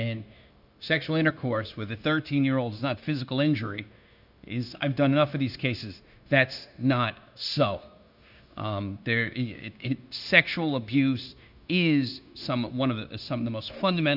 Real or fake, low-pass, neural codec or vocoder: fake; 5.4 kHz; codec, 16 kHz, 0.9 kbps, LongCat-Audio-Codec